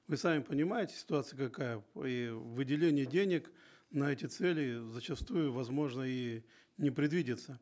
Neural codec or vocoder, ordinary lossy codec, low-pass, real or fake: none; none; none; real